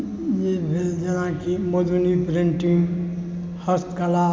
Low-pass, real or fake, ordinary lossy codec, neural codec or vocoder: none; real; none; none